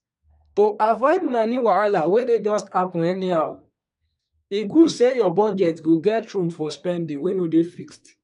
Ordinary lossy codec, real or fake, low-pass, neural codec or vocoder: none; fake; 10.8 kHz; codec, 24 kHz, 1 kbps, SNAC